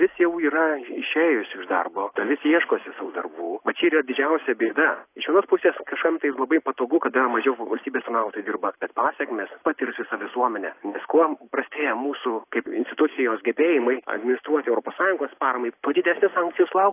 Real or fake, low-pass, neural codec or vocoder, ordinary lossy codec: real; 3.6 kHz; none; AAC, 24 kbps